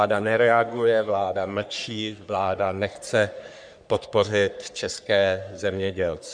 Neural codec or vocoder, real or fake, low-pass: codec, 44.1 kHz, 3.4 kbps, Pupu-Codec; fake; 9.9 kHz